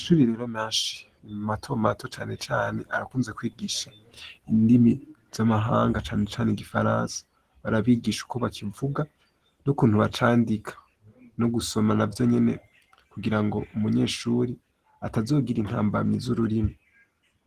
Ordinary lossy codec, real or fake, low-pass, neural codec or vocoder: Opus, 16 kbps; real; 14.4 kHz; none